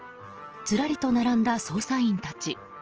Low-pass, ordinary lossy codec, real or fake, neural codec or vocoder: 7.2 kHz; Opus, 16 kbps; real; none